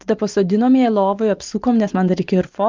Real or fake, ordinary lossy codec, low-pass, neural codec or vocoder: real; Opus, 32 kbps; 7.2 kHz; none